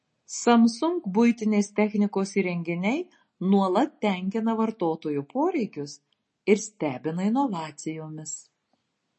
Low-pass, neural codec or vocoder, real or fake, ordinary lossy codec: 10.8 kHz; none; real; MP3, 32 kbps